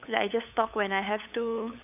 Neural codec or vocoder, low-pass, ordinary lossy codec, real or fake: codec, 16 kHz, 8 kbps, FunCodec, trained on LibriTTS, 25 frames a second; 3.6 kHz; none; fake